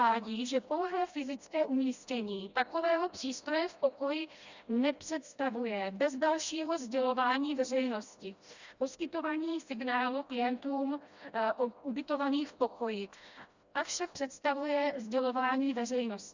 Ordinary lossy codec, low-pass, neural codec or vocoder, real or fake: Opus, 64 kbps; 7.2 kHz; codec, 16 kHz, 1 kbps, FreqCodec, smaller model; fake